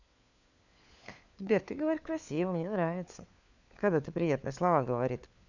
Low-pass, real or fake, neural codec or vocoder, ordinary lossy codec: 7.2 kHz; fake; codec, 16 kHz, 4 kbps, FunCodec, trained on LibriTTS, 50 frames a second; none